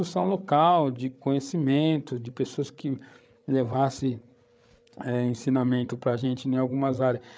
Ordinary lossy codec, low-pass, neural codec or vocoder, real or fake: none; none; codec, 16 kHz, 8 kbps, FreqCodec, larger model; fake